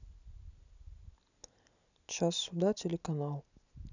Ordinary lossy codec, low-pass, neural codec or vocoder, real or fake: none; 7.2 kHz; none; real